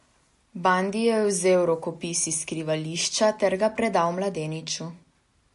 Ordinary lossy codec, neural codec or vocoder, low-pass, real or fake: MP3, 48 kbps; none; 14.4 kHz; real